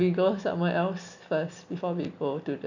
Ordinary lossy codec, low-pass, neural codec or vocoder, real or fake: none; 7.2 kHz; none; real